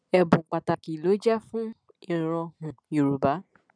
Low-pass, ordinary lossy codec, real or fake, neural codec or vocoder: 9.9 kHz; none; real; none